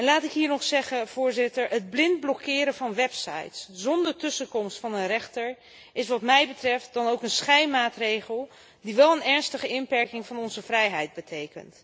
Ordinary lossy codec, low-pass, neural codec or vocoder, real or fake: none; none; none; real